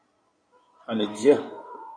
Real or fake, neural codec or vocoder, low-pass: fake; codec, 16 kHz in and 24 kHz out, 2.2 kbps, FireRedTTS-2 codec; 9.9 kHz